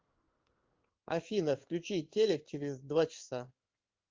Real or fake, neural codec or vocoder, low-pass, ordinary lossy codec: fake; codec, 16 kHz in and 24 kHz out, 1 kbps, XY-Tokenizer; 7.2 kHz; Opus, 32 kbps